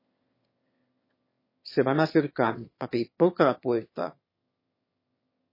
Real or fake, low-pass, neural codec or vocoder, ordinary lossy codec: fake; 5.4 kHz; autoencoder, 22.05 kHz, a latent of 192 numbers a frame, VITS, trained on one speaker; MP3, 24 kbps